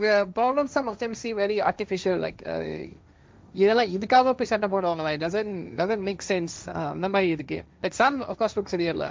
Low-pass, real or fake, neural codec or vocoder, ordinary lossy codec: none; fake; codec, 16 kHz, 1.1 kbps, Voila-Tokenizer; none